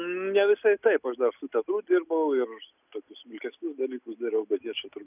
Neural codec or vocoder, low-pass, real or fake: none; 3.6 kHz; real